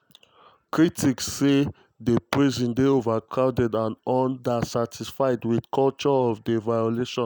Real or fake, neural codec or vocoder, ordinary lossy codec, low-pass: real; none; none; none